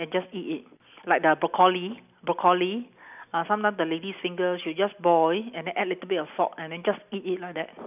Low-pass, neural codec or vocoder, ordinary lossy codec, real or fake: 3.6 kHz; none; none; real